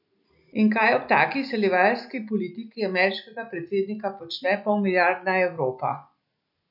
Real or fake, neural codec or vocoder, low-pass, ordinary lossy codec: real; none; 5.4 kHz; none